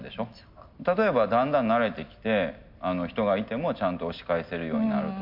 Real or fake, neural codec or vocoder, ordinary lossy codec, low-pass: real; none; MP3, 48 kbps; 5.4 kHz